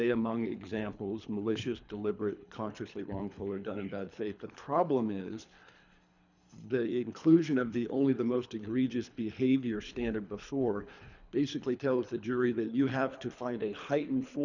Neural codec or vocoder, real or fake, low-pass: codec, 24 kHz, 3 kbps, HILCodec; fake; 7.2 kHz